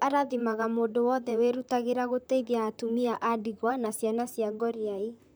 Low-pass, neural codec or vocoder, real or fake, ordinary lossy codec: none; vocoder, 44.1 kHz, 128 mel bands, Pupu-Vocoder; fake; none